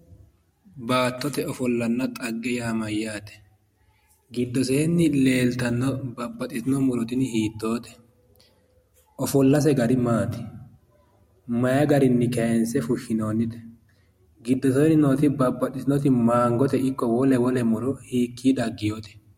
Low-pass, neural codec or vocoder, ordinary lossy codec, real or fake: 19.8 kHz; none; MP3, 64 kbps; real